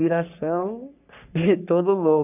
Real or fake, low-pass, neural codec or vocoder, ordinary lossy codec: fake; 3.6 kHz; codec, 44.1 kHz, 3.4 kbps, Pupu-Codec; none